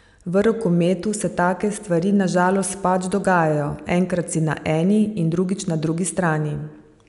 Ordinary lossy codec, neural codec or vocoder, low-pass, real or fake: none; none; 10.8 kHz; real